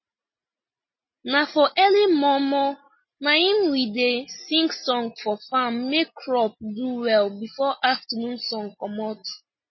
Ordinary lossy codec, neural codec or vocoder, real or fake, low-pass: MP3, 24 kbps; none; real; 7.2 kHz